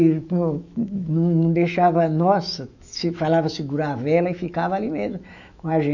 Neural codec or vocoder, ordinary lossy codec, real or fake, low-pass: autoencoder, 48 kHz, 128 numbers a frame, DAC-VAE, trained on Japanese speech; none; fake; 7.2 kHz